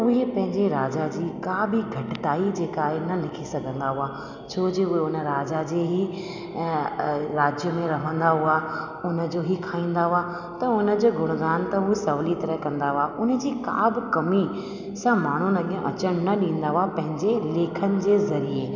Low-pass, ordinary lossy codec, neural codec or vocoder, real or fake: 7.2 kHz; none; none; real